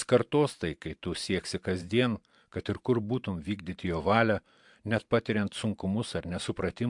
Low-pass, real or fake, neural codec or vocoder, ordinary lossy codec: 10.8 kHz; fake; vocoder, 44.1 kHz, 128 mel bands every 512 samples, BigVGAN v2; MP3, 64 kbps